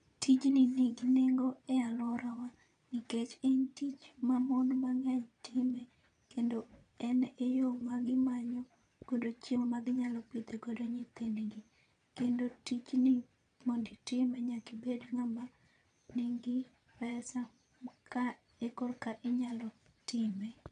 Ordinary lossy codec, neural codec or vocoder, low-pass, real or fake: none; vocoder, 22.05 kHz, 80 mel bands, Vocos; 9.9 kHz; fake